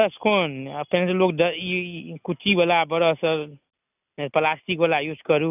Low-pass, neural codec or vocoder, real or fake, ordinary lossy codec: 3.6 kHz; none; real; none